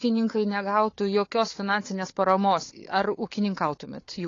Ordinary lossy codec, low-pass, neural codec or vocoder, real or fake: AAC, 32 kbps; 7.2 kHz; codec, 16 kHz, 4 kbps, FunCodec, trained on Chinese and English, 50 frames a second; fake